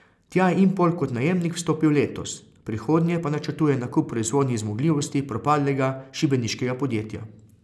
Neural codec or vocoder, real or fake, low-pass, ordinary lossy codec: none; real; none; none